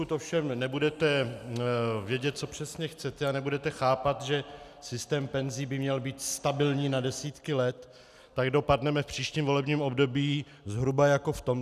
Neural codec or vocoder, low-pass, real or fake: none; 14.4 kHz; real